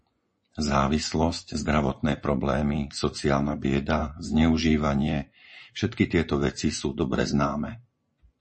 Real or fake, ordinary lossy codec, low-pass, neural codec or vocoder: fake; MP3, 32 kbps; 10.8 kHz; vocoder, 24 kHz, 100 mel bands, Vocos